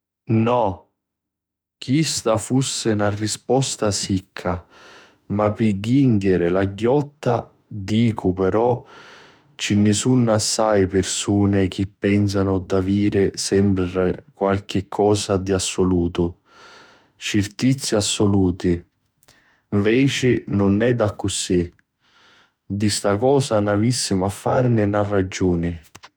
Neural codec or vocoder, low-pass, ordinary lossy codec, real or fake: autoencoder, 48 kHz, 32 numbers a frame, DAC-VAE, trained on Japanese speech; none; none; fake